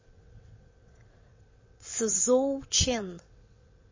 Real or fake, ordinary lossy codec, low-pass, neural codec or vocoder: fake; MP3, 32 kbps; 7.2 kHz; vocoder, 22.05 kHz, 80 mel bands, WaveNeXt